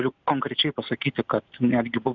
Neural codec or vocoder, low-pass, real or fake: none; 7.2 kHz; real